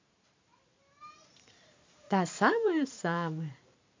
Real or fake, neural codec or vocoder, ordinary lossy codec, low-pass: fake; vocoder, 44.1 kHz, 128 mel bands every 512 samples, BigVGAN v2; MP3, 48 kbps; 7.2 kHz